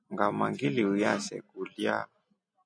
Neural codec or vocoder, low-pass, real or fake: none; 9.9 kHz; real